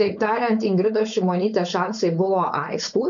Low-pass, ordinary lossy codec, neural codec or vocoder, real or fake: 7.2 kHz; MP3, 64 kbps; codec, 16 kHz, 4.8 kbps, FACodec; fake